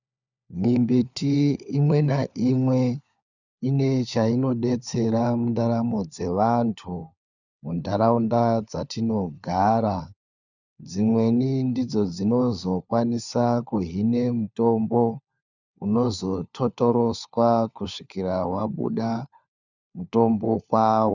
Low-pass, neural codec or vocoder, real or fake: 7.2 kHz; codec, 16 kHz, 4 kbps, FunCodec, trained on LibriTTS, 50 frames a second; fake